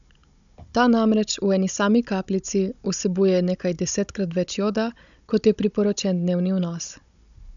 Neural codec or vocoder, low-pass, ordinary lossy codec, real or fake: codec, 16 kHz, 16 kbps, FunCodec, trained on Chinese and English, 50 frames a second; 7.2 kHz; none; fake